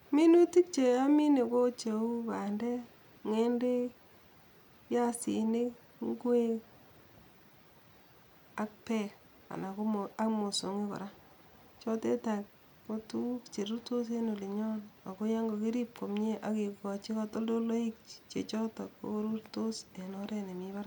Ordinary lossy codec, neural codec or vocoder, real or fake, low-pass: none; none; real; 19.8 kHz